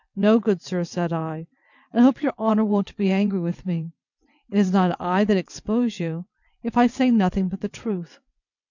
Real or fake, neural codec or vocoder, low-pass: fake; vocoder, 22.05 kHz, 80 mel bands, WaveNeXt; 7.2 kHz